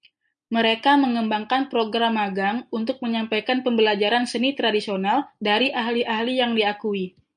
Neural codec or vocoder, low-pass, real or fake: none; 9.9 kHz; real